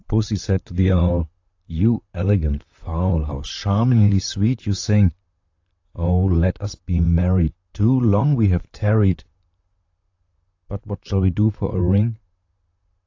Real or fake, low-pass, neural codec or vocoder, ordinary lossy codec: fake; 7.2 kHz; vocoder, 44.1 kHz, 128 mel bands, Pupu-Vocoder; AAC, 48 kbps